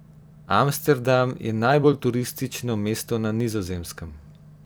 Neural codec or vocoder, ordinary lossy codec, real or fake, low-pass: vocoder, 44.1 kHz, 128 mel bands every 256 samples, BigVGAN v2; none; fake; none